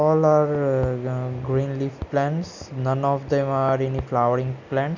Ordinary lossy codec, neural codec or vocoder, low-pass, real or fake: Opus, 64 kbps; none; 7.2 kHz; real